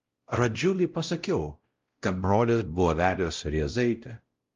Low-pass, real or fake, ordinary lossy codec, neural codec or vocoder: 7.2 kHz; fake; Opus, 32 kbps; codec, 16 kHz, 0.5 kbps, X-Codec, WavLM features, trained on Multilingual LibriSpeech